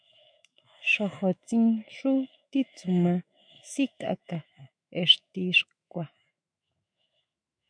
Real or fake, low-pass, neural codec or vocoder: fake; 9.9 kHz; autoencoder, 48 kHz, 128 numbers a frame, DAC-VAE, trained on Japanese speech